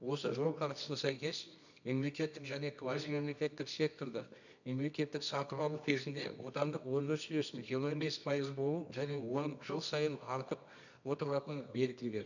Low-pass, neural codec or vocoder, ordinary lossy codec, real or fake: 7.2 kHz; codec, 24 kHz, 0.9 kbps, WavTokenizer, medium music audio release; none; fake